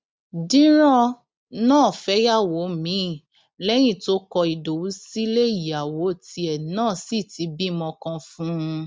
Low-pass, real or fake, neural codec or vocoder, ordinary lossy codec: none; real; none; none